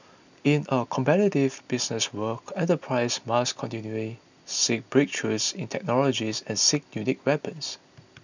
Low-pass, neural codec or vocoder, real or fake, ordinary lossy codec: 7.2 kHz; none; real; none